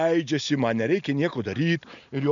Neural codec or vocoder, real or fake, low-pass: none; real; 7.2 kHz